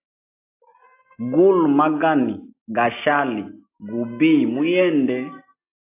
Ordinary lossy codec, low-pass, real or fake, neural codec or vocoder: AAC, 24 kbps; 3.6 kHz; real; none